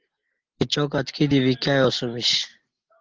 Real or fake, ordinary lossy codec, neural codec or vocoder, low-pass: real; Opus, 16 kbps; none; 7.2 kHz